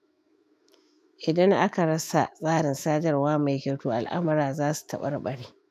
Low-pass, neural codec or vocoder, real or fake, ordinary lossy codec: 14.4 kHz; autoencoder, 48 kHz, 128 numbers a frame, DAC-VAE, trained on Japanese speech; fake; none